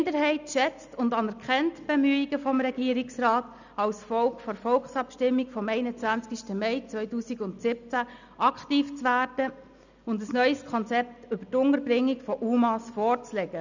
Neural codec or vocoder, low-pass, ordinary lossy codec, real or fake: none; 7.2 kHz; none; real